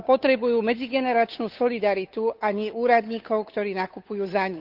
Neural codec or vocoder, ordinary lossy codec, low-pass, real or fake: codec, 16 kHz, 8 kbps, FunCodec, trained on Chinese and English, 25 frames a second; Opus, 32 kbps; 5.4 kHz; fake